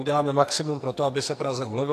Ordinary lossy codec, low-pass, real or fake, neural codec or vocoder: AAC, 64 kbps; 14.4 kHz; fake; codec, 44.1 kHz, 2.6 kbps, SNAC